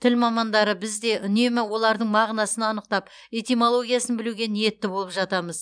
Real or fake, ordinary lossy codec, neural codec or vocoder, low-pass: fake; none; vocoder, 44.1 kHz, 128 mel bands every 512 samples, BigVGAN v2; 9.9 kHz